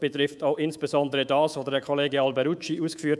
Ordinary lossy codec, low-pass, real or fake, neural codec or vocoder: none; none; fake; codec, 24 kHz, 3.1 kbps, DualCodec